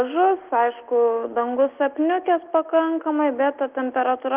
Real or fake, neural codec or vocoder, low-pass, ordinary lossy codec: real; none; 3.6 kHz; Opus, 32 kbps